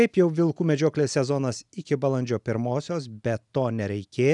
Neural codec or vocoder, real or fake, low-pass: none; real; 10.8 kHz